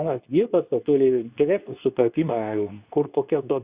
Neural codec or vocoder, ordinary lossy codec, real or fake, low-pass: codec, 24 kHz, 0.9 kbps, WavTokenizer, medium speech release version 2; Opus, 64 kbps; fake; 3.6 kHz